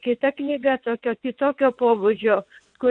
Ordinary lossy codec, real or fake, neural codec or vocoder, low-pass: MP3, 64 kbps; fake; vocoder, 22.05 kHz, 80 mel bands, WaveNeXt; 9.9 kHz